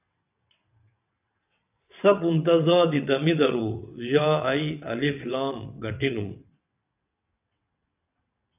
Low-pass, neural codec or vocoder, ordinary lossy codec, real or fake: 3.6 kHz; vocoder, 22.05 kHz, 80 mel bands, WaveNeXt; AAC, 32 kbps; fake